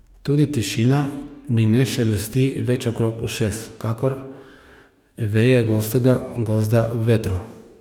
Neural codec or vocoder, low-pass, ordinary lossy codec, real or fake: codec, 44.1 kHz, 2.6 kbps, DAC; 19.8 kHz; none; fake